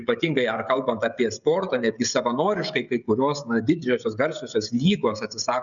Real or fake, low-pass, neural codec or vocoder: fake; 7.2 kHz; codec, 16 kHz, 16 kbps, FreqCodec, smaller model